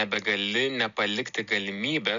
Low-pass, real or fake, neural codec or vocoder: 7.2 kHz; real; none